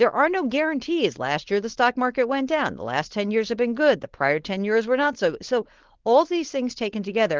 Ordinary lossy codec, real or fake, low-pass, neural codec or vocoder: Opus, 16 kbps; fake; 7.2 kHz; codec, 16 kHz, 4.8 kbps, FACodec